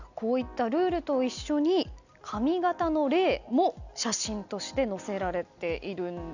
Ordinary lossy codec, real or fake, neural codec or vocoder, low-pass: none; real; none; 7.2 kHz